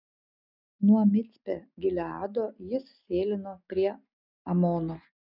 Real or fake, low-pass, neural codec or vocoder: real; 5.4 kHz; none